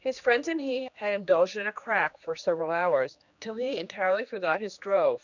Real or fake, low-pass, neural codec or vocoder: fake; 7.2 kHz; codec, 16 kHz, 2 kbps, X-Codec, HuBERT features, trained on general audio